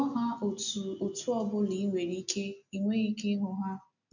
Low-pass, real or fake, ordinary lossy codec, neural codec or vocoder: 7.2 kHz; real; none; none